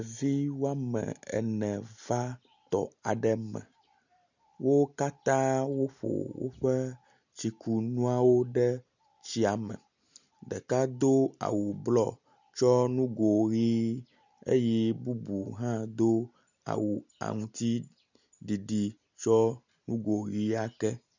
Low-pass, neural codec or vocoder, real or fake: 7.2 kHz; none; real